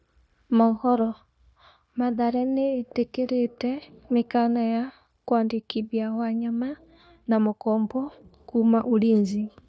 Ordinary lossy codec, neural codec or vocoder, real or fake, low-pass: none; codec, 16 kHz, 0.9 kbps, LongCat-Audio-Codec; fake; none